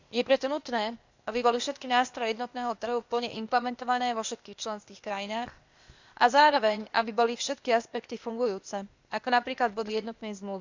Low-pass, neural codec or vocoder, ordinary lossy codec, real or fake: 7.2 kHz; codec, 16 kHz, 0.8 kbps, ZipCodec; Opus, 64 kbps; fake